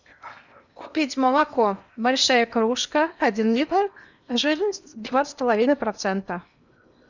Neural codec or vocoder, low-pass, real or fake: codec, 16 kHz in and 24 kHz out, 0.8 kbps, FocalCodec, streaming, 65536 codes; 7.2 kHz; fake